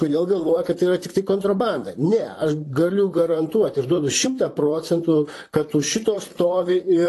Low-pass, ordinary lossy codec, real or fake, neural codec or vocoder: 14.4 kHz; AAC, 48 kbps; fake; vocoder, 44.1 kHz, 128 mel bands, Pupu-Vocoder